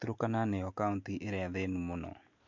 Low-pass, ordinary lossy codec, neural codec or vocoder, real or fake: 7.2 kHz; MP3, 48 kbps; none; real